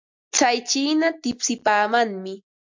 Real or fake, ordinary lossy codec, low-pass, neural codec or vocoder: real; MP3, 48 kbps; 7.2 kHz; none